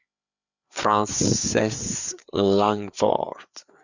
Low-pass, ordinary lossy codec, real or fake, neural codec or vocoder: 7.2 kHz; Opus, 64 kbps; fake; codec, 16 kHz, 4 kbps, FreqCodec, larger model